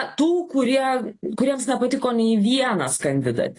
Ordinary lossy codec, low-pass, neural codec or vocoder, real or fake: AAC, 32 kbps; 10.8 kHz; none; real